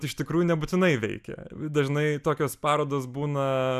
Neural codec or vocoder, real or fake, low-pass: none; real; 14.4 kHz